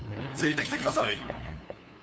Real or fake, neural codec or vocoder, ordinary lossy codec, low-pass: fake; codec, 16 kHz, 2 kbps, FunCodec, trained on LibriTTS, 25 frames a second; none; none